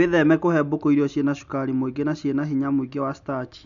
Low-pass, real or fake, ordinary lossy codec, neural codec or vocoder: 7.2 kHz; real; none; none